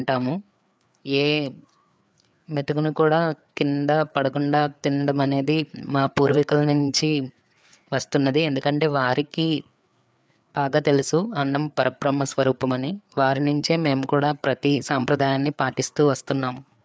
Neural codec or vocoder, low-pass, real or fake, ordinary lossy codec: codec, 16 kHz, 4 kbps, FreqCodec, larger model; none; fake; none